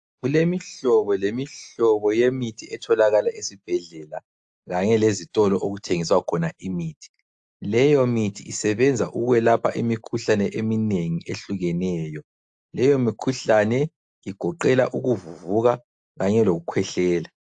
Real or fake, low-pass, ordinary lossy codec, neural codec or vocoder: real; 9.9 kHz; AAC, 64 kbps; none